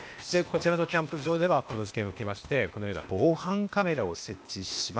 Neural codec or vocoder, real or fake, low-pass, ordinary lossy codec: codec, 16 kHz, 0.8 kbps, ZipCodec; fake; none; none